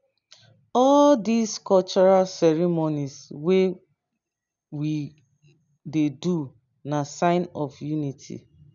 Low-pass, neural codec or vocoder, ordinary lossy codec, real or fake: 7.2 kHz; none; none; real